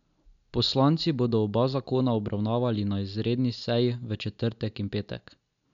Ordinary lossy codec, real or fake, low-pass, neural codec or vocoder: none; real; 7.2 kHz; none